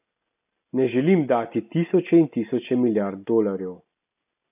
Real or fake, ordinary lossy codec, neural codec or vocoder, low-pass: real; none; none; 3.6 kHz